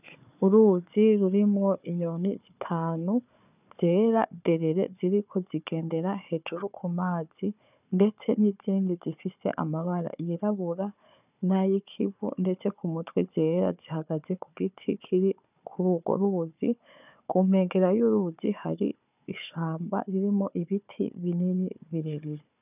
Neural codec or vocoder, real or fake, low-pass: codec, 16 kHz, 4 kbps, FunCodec, trained on Chinese and English, 50 frames a second; fake; 3.6 kHz